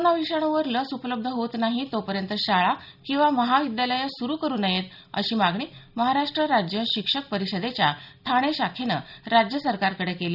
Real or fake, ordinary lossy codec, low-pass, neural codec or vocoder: real; none; 5.4 kHz; none